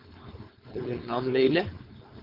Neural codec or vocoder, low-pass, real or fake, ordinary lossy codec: codec, 16 kHz, 4.8 kbps, FACodec; 5.4 kHz; fake; Opus, 32 kbps